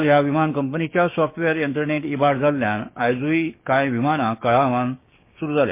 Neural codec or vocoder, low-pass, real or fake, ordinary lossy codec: codec, 16 kHz, 6 kbps, DAC; 3.6 kHz; fake; MP3, 24 kbps